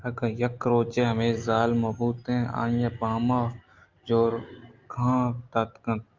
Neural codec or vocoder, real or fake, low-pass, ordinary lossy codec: none; real; 7.2 kHz; Opus, 24 kbps